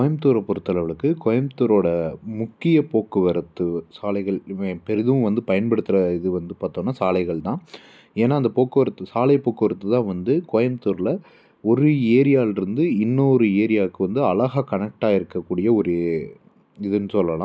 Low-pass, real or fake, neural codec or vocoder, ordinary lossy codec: none; real; none; none